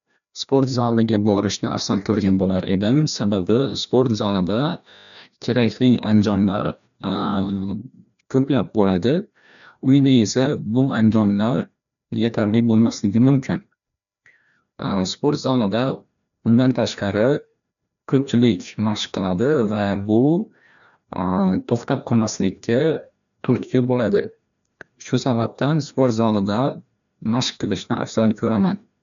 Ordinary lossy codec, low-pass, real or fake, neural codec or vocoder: none; 7.2 kHz; fake; codec, 16 kHz, 1 kbps, FreqCodec, larger model